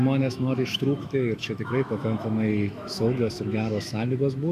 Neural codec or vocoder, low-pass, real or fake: autoencoder, 48 kHz, 128 numbers a frame, DAC-VAE, trained on Japanese speech; 14.4 kHz; fake